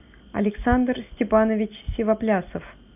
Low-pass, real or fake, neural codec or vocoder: 3.6 kHz; real; none